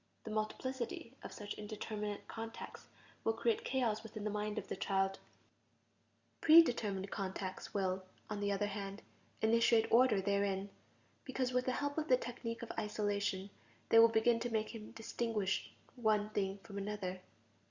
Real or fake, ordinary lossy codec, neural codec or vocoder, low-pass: real; Opus, 64 kbps; none; 7.2 kHz